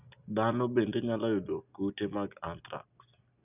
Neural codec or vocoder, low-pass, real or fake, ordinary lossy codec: none; 3.6 kHz; real; none